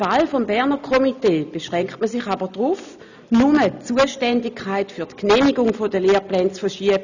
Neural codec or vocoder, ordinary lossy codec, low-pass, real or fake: none; none; 7.2 kHz; real